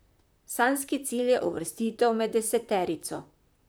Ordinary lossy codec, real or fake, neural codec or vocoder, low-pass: none; fake; vocoder, 44.1 kHz, 128 mel bands, Pupu-Vocoder; none